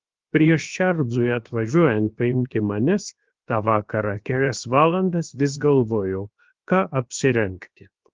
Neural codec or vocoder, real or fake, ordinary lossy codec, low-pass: codec, 16 kHz, 0.7 kbps, FocalCodec; fake; Opus, 32 kbps; 7.2 kHz